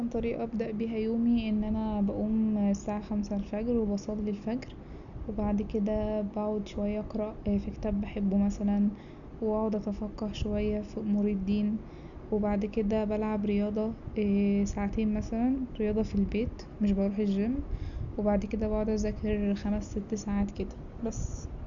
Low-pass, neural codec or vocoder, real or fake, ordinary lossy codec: 7.2 kHz; none; real; none